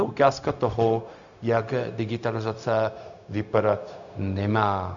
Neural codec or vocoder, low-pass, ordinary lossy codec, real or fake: codec, 16 kHz, 0.4 kbps, LongCat-Audio-Codec; 7.2 kHz; MP3, 96 kbps; fake